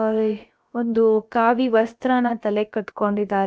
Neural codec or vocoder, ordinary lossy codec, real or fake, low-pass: codec, 16 kHz, 0.7 kbps, FocalCodec; none; fake; none